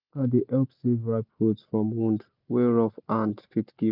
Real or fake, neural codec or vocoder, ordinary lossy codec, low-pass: real; none; none; 5.4 kHz